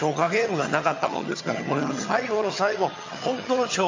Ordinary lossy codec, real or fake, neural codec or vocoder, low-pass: MP3, 64 kbps; fake; vocoder, 22.05 kHz, 80 mel bands, HiFi-GAN; 7.2 kHz